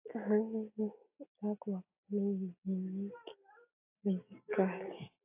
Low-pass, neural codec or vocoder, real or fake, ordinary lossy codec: 3.6 kHz; none; real; AAC, 32 kbps